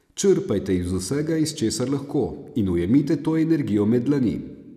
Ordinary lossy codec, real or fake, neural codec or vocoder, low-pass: none; real; none; 14.4 kHz